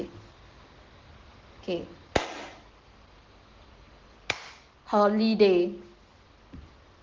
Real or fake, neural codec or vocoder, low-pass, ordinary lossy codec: real; none; 7.2 kHz; Opus, 16 kbps